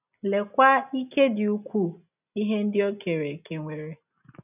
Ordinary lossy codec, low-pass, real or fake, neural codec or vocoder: none; 3.6 kHz; real; none